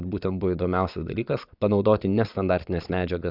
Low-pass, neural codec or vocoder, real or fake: 5.4 kHz; vocoder, 22.05 kHz, 80 mel bands, Vocos; fake